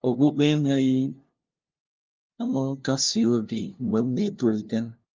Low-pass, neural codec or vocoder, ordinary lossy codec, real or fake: 7.2 kHz; codec, 16 kHz, 0.5 kbps, FunCodec, trained on LibriTTS, 25 frames a second; Opus, 32 kbps; fake